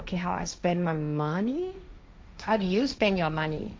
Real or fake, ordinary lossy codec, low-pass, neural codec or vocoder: fake; none; none; codec, 16 kHz, 1.1 kbps, Voila-Tokenizer